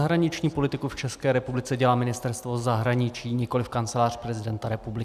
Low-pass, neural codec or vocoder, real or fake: 14.4 kHz; none; real